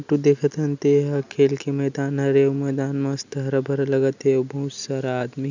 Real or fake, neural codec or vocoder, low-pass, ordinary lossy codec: real; none; 7.2 kHz; none